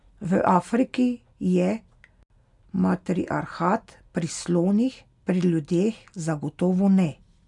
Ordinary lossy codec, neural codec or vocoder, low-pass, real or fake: none; none; 10.8 kHz; real